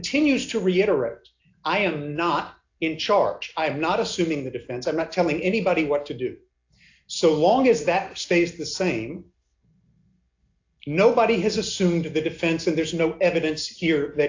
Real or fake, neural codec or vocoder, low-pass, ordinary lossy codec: real; none; 7.2 kHz; AAC, 48 kbps